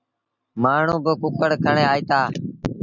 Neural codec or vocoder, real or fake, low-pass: none; real; 7.2 kHz